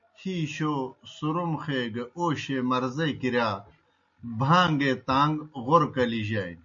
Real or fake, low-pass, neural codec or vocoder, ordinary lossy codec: real; 7.2 kHz; none; MP3, 48 kbps